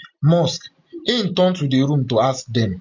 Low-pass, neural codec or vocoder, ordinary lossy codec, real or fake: 7.2 kHz; none; MP3, 48 kbps; real